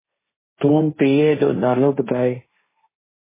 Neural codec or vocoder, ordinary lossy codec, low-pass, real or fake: codec, 16 kHz, 1.1 kbps, Voila-Tokenizer; MP3, 16 kbps; 3.6 kHz; fake